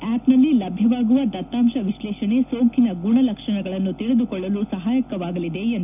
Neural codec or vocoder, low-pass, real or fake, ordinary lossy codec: none; 3.6 kHz; real; none